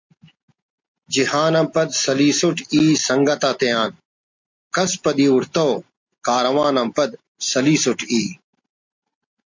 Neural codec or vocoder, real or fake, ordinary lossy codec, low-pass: none; real; MP3, 64 kbps; 7.2 kHz